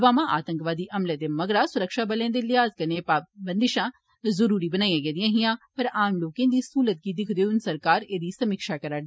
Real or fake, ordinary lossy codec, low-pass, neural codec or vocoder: real; none; none; none